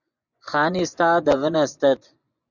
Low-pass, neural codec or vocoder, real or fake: 7.2 kHz; vocoder, 24 kHz, 100 mel bands, Vocos; fake